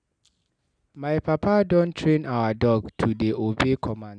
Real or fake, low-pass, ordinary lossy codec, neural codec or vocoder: real; 9.9 kHz; none; none